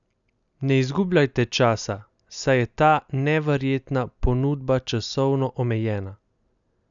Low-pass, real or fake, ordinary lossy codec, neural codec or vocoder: 7.2 kHz; real; none; none